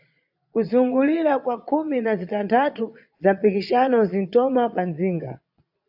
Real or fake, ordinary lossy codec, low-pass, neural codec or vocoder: fake; AAC, 48 kbps; 5.4 kHz; vocoder, 22.05 kHz, 80 mel bands, Vocos